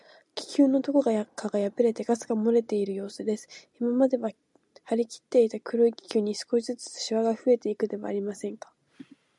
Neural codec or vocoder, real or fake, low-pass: none; real; 10.8 kHz